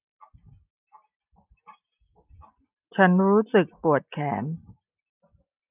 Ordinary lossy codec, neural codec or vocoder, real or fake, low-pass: none; none; real; 3.6 kHz